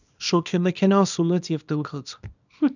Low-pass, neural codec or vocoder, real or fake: 7.2 kHz; codec, 24 kHz, 0.9 kbps, WavTokenizer, small release; fake